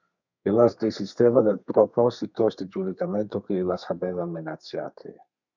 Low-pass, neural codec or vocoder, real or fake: 7.2 kHz; codec, 32 kHz, 1.9 kbps, SNAC; fake